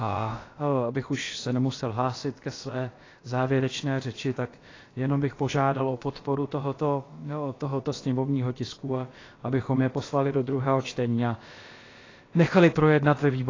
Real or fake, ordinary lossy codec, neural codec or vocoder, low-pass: fake; AAC, 32 kbps; codec, 16 kHz, about 1 kbps, DyCAST, with the encoder's durations; 7.2 kHz